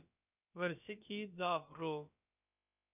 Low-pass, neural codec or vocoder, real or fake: 3.6 kHz; codec, 16 kHz, about 1 kbps, DyCAST, with the encoder's durations; fake